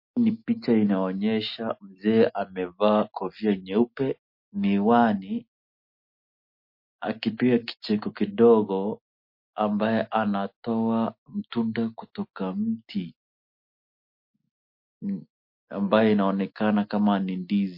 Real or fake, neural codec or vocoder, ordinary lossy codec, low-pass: real; none; MP3, 32 kbps; 5.4 kHz